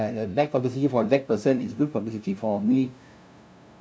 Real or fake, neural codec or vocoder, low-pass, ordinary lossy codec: fake; codec, 16 kHz, 0.5 kbps, FunCodec, trained on LibriTTS, 25 frames a second; none; none